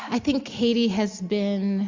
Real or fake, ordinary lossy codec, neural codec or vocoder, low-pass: real; MP3, 64 kbps; none; 7.2 kHz